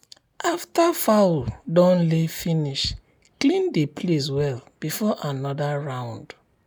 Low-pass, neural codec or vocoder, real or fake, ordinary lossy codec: none; none; real; none